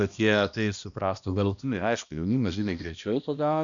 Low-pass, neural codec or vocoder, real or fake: 7.2 kHz; codec, 16 kHz, 1 kbps, X-Codec, HuBERT features, trained on balanced general audio; fake